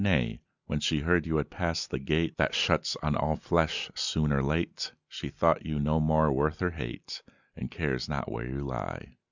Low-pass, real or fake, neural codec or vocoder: 7.2 kHz; real; none